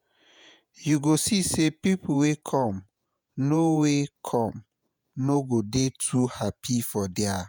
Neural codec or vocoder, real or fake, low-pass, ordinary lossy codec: vocoder, 48 kHz, 128 mel bands, Vocos; fake; none; none